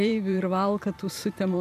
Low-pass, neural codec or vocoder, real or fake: 14.4 kHz; vocoder, 44.1 kHz, 128 mel bands every 512 samples, BigVGAN v2; fake